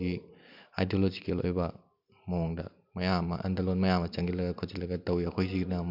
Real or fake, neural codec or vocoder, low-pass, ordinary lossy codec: real; none; 5.4 kHz; MP3, 48 kbps